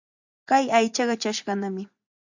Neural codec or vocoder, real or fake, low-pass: vocoder, 24 kHz, 100 mel bands, Vocos; fake; 7.2 kHz